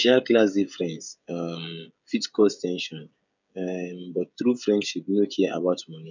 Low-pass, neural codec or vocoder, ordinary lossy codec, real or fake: 7.2 kHz; codec, 16 kHz, 16 kbps, FreqCodec, smaller model; none; fake